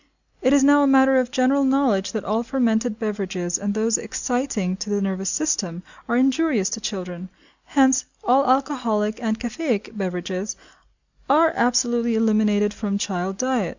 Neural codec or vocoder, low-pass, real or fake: none; 7.2 kHz; real